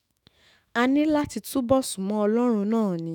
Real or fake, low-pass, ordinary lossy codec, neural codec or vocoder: fake; 19.8 kHz; none; autoencoder, 48 kHz, 128 numbers a frame, DAC-VAE, trained on Japanese speech